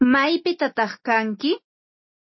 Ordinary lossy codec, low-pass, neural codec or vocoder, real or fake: MP3, 24 kbps; 7.2 kHz; none; real